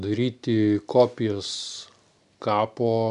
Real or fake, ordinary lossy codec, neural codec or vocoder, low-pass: real; AAC, 96 kbps; none; 10.8 kHz